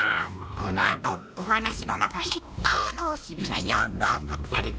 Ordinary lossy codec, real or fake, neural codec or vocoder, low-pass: none; fake; codec, 16 kHz, 1 kbps, X-Codec, WavLM features, trained on Multilingual LibriSpeech; none